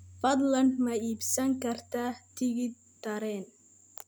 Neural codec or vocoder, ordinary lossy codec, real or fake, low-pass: none; none; real; none